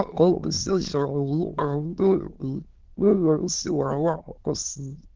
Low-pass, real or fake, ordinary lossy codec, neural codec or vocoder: 7.2 kHz; fake; Opus, 16 kbps; autoencoder, 22.05 kHz, a latent of 192 numbers a frame, VITS, trained on many speakers